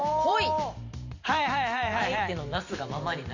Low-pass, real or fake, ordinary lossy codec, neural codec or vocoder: 7.2 kHz; fake; none; vocoder, 44.1 kHz, 128 mel bands every 512 samples, BigVGAN v2